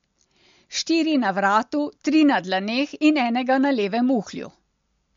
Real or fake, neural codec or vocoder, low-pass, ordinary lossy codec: real; none; 7.2 kHz; MP3, 48 kbps